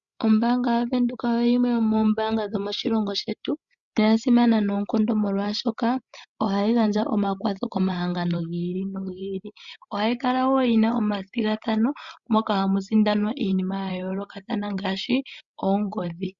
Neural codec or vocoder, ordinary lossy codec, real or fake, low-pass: codec, 16 kHz, 16 kbps, FreqCodec, larger model; Opus, 64 kbps; fake; 7.2 kHz